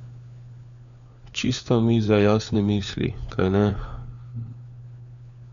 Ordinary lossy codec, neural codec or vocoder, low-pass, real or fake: none; codec, 16 kHz, 4 kbps, FunCodec, trained on LibriTTS, 50 frames a second; 7.2 kHz; fake